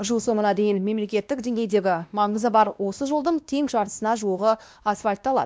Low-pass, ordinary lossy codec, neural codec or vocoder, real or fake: none; none; codec, 16 kHz, 1 kbps, X-Codec, WavLM features, trained on Multilingual LibriSpeech; fake